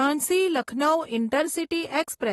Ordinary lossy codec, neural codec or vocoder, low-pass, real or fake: AAC, 32 kbps; vocoder, 44.1 kHz, 128 mel bands, Pupu-Vocoder; 19.8 kHz; fake